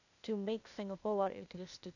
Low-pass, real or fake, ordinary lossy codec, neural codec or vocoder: 7.2 kHz; fake; none; codec, 16 kHz, 0.8 kbps, ZipCodec